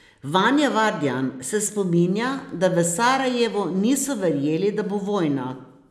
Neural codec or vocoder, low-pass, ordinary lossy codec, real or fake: none; none; none; real